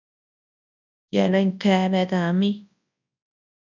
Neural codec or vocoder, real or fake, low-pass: codec, 24 kHz, 0.9 kbps, WavTokenizer, large speech release; fake; 7.2 kHz